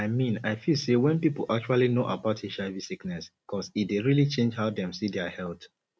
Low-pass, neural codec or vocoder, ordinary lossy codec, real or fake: none; none; none; real